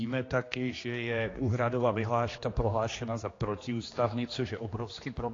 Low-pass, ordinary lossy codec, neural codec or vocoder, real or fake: 7.2 kHz; AAC, 32 kbps; codec, 16 kHz, 2 kbps, X-Codec, HuBERT features, trained on general audio; fake